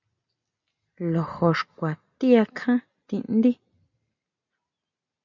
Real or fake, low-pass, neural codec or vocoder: real; 7.2 kHz; none